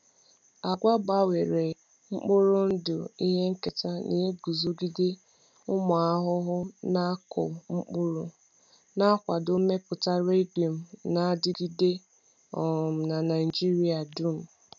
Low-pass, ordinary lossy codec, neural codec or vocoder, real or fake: 7.2 kHz; none; none; real